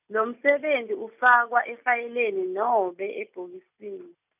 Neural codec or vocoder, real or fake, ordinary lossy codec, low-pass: none; real; none; 3.6 kHz